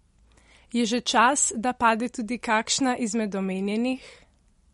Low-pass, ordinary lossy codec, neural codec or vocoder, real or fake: 19.8 kHz; MP3, 48 kbps; none; real